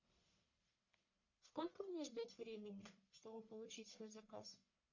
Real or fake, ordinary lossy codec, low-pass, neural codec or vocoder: fake; MP3, 48 kbps; 7.2 kHz; codec, 44.1 kHz, 1.7 kbps, Pupu-Codec